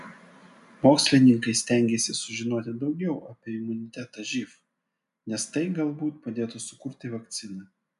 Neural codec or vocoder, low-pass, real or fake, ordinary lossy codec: none; 10.8 kHz; real; AAC, 96 kbps